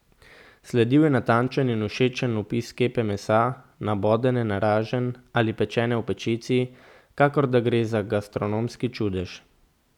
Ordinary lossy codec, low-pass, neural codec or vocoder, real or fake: none; 19.8 kHz; none; real